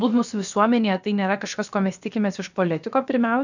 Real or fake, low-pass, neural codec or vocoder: fake; 7.2 kHz; codec, 16 kHz, about 1 kbps, DyCAST, with the encoder's durations